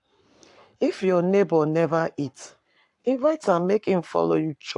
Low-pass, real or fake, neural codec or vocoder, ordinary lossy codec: 10.8 kHz; fake; codec, 44.1 kHz, 7.8 kbps, Pupu-Codec; AAC, 64 kbps